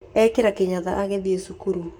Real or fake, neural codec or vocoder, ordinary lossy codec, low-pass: fake; codec, 44.1 kHz, 7.8 kbps, Pupu-Codec; none; none